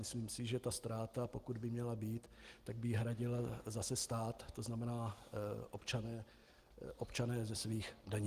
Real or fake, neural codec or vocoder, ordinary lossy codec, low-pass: real; none; Opus, 24 kbps; 14.4 kHz